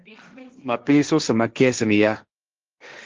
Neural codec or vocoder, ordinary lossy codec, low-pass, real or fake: codec, 16 kHz, 1.1 kbps, Voila-Tokenizer; Opus, 32 kbps; 7.2 kHz; fake